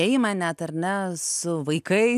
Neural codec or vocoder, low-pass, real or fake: none; 14.4 kHz; real